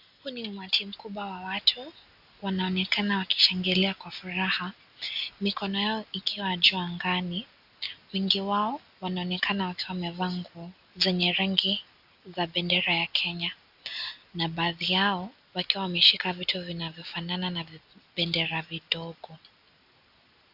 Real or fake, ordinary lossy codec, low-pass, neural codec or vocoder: real; AAC, 48 kbps; 5.4 kHz; none